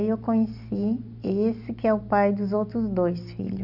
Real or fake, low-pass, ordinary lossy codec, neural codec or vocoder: real; 5.4 kHz; none; none